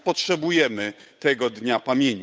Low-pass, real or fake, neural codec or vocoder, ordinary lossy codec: none; fake; codec, 16 kHz, 8 kbps, FunCodec, trained on Chinese and English, 25 frames a second; none